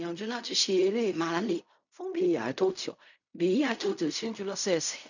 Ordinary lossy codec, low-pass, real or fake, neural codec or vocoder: none; 7.2 kHz; fake; codec, 16 kHz in and 24 kHz out, 0.4 kbps, LongCat-Audio-Codec, fine tuned four codebook decoder